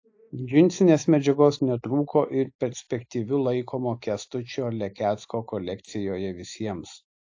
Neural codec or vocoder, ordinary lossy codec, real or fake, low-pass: none; AAC, 48 kbps; real; 7.2 kHz